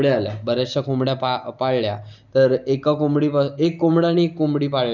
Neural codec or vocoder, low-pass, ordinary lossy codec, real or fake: none; 7.2 kHz; none; real